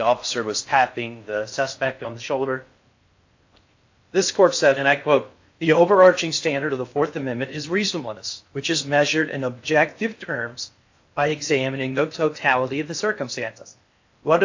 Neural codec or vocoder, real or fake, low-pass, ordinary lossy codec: codec, 16 kHz in and 24 kHz out, 0.6 kbps, FocalCodec, streaming, 4096 codes; fake; 7.2 kHz; AAC, 48 kbps